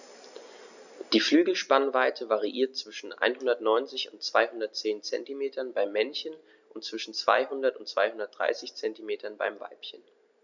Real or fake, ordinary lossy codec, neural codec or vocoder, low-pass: real; none; none; 7.2 kHz